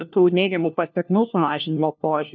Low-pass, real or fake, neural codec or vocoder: 7.2 kHz; fake; codec, 16 kHz, 1 kbps, FunCodec, trained on LibriTTS, 50 frames a second